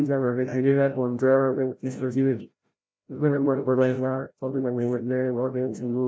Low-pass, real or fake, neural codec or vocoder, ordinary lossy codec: none; fake; codec, 16 kHz, 0.5 kbps, FreqCodec, larger model; none